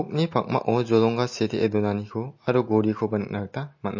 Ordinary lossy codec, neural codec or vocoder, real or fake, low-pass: MP3, 32 kbps; none; real; 7.2 kHz